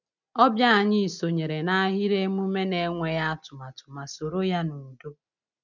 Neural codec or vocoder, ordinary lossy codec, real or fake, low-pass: none; none; real; 7.2 kHz